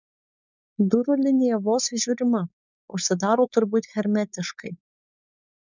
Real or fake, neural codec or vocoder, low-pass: fake; codec, 16 kHz, 4.8 kbps, FACodec; 7.2 kHz